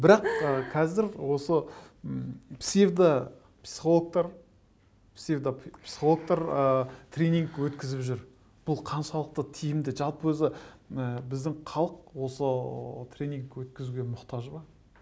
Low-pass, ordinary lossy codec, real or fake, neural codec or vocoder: none; none; real; none